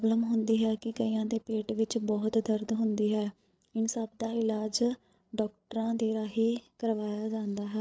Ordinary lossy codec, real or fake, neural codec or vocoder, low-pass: none; fake; codec, 16 kHz, 8 kbps, FreqCodec, larger model; none